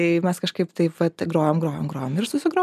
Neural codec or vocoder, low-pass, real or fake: none; 14.4 kHz; real